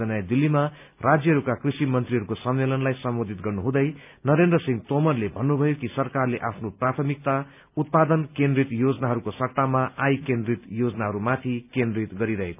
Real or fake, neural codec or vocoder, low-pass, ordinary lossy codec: real; none; 3.6 kHz; none